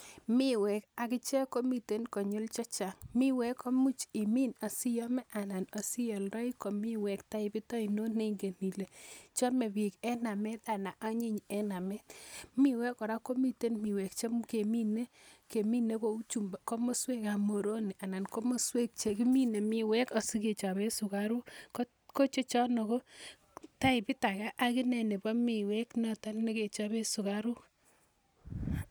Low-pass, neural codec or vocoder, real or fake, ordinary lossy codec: none; none; real; none